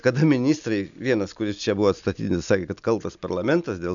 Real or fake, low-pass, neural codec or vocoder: real; 7.2 kHz; none